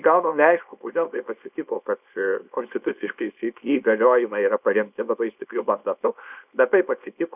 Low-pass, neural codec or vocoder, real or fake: 3.6 kHz; codec, 24 kHz, 0.9 kbps, WavTokenizer, small release; fake